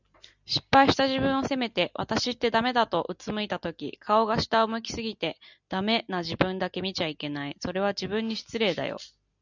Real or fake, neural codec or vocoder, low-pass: real; none; 7.2 kHz